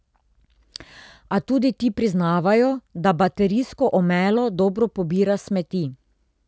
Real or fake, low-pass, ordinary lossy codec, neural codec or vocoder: real; none; none; none